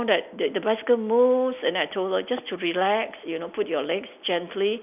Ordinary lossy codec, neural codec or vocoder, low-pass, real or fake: none; none; 3.6 kHz; real